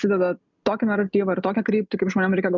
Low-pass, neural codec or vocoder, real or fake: 7.2 kHz; none; real